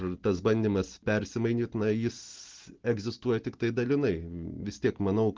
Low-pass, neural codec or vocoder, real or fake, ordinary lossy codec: 7.2 kHz; none; real; Opus, 16 kbps